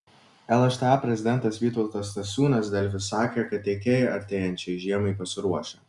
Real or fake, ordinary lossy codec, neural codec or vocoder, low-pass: real; Opus, 64 kbps; none; 10.8 kHz